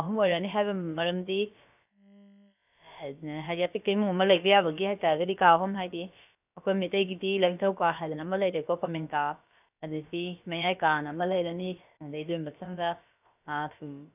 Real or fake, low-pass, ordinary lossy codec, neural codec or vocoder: fake; 3.6 kHz; none; codec, 16 kHz, about 1 kbps, DyCAST, with the encoder's durations